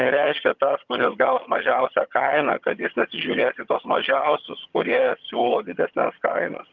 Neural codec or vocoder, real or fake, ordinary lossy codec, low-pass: vocoder, 22.05 kHz, 80 mel bands, HiFi-GAN; fake; Opus, 32 kbps; 7.2 kHz